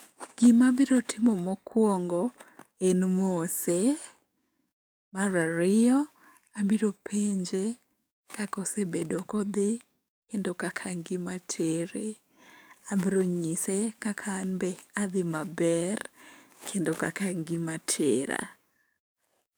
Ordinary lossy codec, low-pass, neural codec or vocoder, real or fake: none; none; codec, 44.1 kHz, 7.8 kbps, DAC; fake